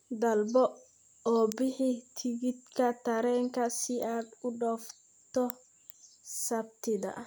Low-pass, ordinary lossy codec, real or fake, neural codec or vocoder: none; none; real; none